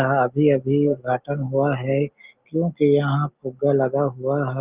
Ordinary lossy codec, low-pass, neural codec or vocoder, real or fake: Opus, 32 kbps; 3.6 kHz; none; real